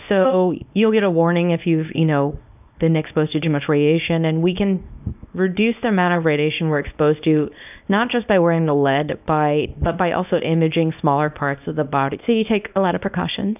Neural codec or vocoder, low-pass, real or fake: codec, 24 kHz, 0.9 kbps, WavTokenizer, small release; 3.6 kHz; fake